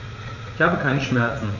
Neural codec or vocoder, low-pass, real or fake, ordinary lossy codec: vocoder, 44.1 kHz, 80 mel bands, Vocos; 7.2 kHz; fake; none